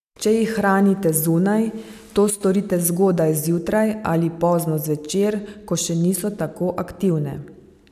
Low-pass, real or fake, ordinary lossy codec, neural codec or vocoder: 14.4 kHz; real; none; none